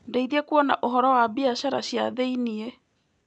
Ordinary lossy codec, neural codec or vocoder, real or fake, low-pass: none; none; real; 10.8 kHz